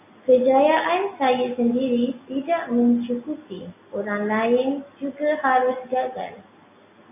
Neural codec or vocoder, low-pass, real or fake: none; 3.6 kHz; real